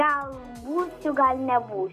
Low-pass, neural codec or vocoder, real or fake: 14.4 kHz; none; real